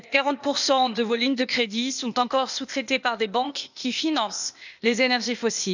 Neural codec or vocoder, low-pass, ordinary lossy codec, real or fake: codec, 16 kHz, 0.8 kbps, ZipCodec; 7.2 kHz; none; fake